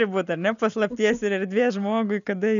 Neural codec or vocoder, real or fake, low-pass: none; real; 7.2 kHz